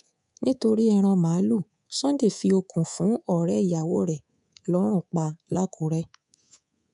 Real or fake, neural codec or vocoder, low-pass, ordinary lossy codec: fake; codec, 24 kHz, 3.1 kbps, DualCodec; 10.8 kHz; none